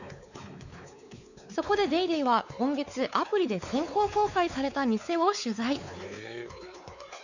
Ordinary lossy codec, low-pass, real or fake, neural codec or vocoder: none; 7.2 kHz; fake; codec, 16 kHz, 4 kbps, X-Codec, WavLM features, trained on Multilingual LibriSpeech